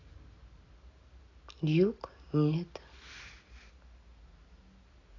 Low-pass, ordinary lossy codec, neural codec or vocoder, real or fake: 7.2 kHz; AAC, 32 kbps; none; real